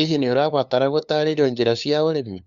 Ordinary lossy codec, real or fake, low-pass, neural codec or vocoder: Opus, 64 kbps; fake; 7.2 kHz; codec, 16 kHz, 2 kbps, FunCodec, trained on LibriTTS, 25 frames a second